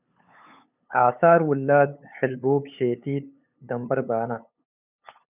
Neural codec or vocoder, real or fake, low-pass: codec, 16 kHz, 8 kbps, FunCodec, trained on LibriTTS, 25 frames a second; fake; 3.6 kHz